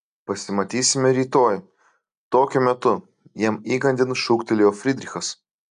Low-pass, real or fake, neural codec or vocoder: 9.9 kHz; real; none